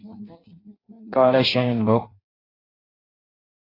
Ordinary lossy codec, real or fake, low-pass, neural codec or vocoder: AAC, 24 kbps; fake; 5.4 kHz; codec, 16 kHz in and 24 kHz out, 0.6 kbps, FireRedTTS-2 codec